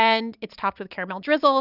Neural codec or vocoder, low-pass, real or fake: none; 5.4 kHz; real